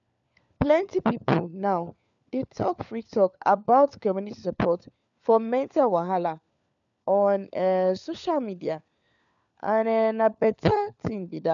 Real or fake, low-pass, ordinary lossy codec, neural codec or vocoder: fake; 7.2 kHz; none; codec, 16 kHz, 4 kbps, FunCodec, trained on LibriTTS, 50 frames a second